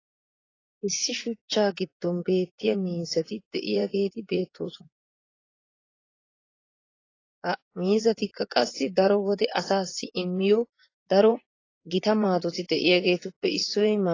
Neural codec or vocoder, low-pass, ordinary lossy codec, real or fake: vocoder, 24 kHz, 100 mel bands, Vocos; 7.2 kHz; AAC, 32 kbps; fake